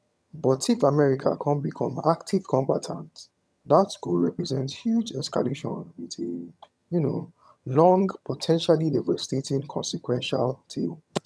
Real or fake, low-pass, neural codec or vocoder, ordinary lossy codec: fake; none; vocoder, 22.05 kHz, 80 mel bands, HiFi-GAN; none